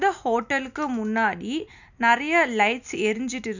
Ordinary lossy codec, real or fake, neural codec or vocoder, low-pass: none; real; none; 7.2 kHz